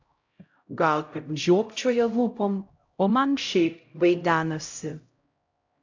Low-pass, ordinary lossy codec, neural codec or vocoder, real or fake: 7.2 kHz; AAC, 48 kbps; codec, 16 kHz, 0.5 kbps, X-Codec, HuBERT features, trained on LibriSpeech; fake